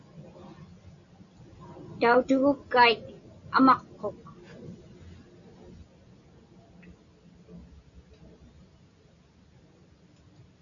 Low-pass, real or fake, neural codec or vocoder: 7.2 kHz; real; none